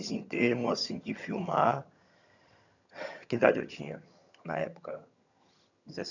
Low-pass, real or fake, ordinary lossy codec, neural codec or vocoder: 7.2 kHz; fake; none; vocoder, 22.05 kHz, 80 mel bands, HiFi-GAN